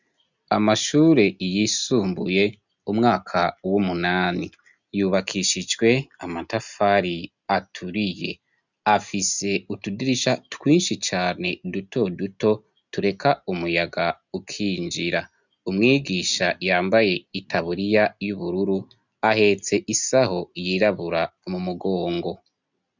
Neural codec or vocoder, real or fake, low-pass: none; real; 7.2 kHz